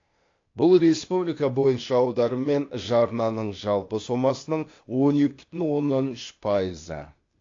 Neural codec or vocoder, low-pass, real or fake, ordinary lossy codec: codec, 16 kHz, 0.8 kbps, ZipCodec; 7.2 kHz; fake; AAC, 48 kbps